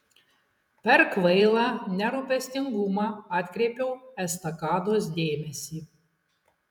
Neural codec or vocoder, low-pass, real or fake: vocoder, 48 kHz, 128 mel bands, Vocos; 19.8 kHz; fake